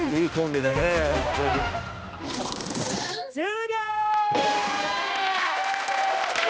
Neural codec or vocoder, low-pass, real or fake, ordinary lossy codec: codec, 16 kHz, 1 kbps, X-Codec, HuBERT features, trained on balanced general audio; none; fake; none